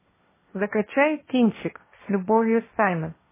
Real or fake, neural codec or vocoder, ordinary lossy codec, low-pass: fake; codec, 16 kHz, 1.1 kbps, Voila-Tokenizer; MP3, 16 kbps; 3.6 kHz